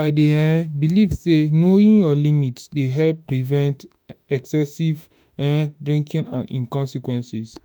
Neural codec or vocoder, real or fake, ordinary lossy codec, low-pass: autoencoder, 48 kHz, 32 numbers a frame, DAC-VAE, trained on Japanese speech; fake; none; none